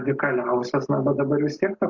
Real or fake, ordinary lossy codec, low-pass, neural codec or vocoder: real; MP3, 48 kbps; 7.2 kHz; none